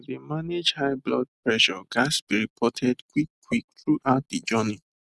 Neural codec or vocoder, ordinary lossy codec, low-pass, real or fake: none; none; none; real